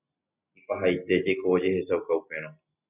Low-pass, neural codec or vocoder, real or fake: 3.6 kHz; none; real